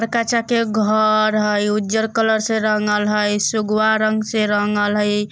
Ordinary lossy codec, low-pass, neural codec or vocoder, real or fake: none; none; none; real